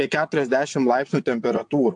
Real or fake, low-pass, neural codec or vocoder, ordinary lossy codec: fake; 9.9 kHz; vocoder, 22.05 kHz, 80 mel bands, WaveNeXt; AAC, 64 kbps